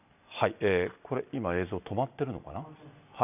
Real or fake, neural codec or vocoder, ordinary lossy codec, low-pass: real; none; none; 3.6 kHz